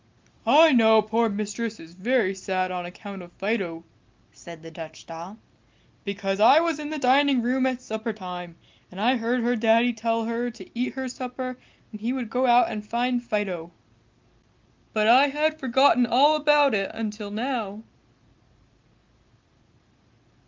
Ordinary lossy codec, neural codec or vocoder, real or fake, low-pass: Opus, 32 kbps; none; real; 7.2 kHz